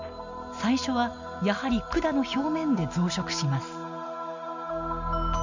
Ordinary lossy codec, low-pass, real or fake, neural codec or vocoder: none; 7.2 kHz; real; none